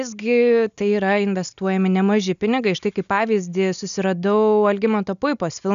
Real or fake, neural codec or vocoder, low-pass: real; none; 7.2 kHz